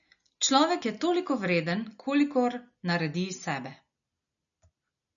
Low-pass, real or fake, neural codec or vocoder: 7.2 kHz; real; none